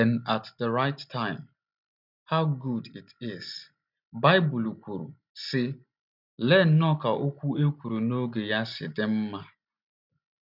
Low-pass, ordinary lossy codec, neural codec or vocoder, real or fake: 5.4 kHz; none; none; real